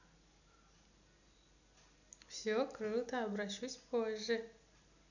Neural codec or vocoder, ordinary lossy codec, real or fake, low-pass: none; none; real; 7.2 kHz